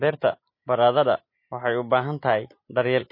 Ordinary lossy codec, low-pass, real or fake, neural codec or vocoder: MP3, 24 kbps; 5.4 kHz; real; none